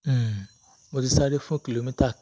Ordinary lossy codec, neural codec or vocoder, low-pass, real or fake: none; none; none; real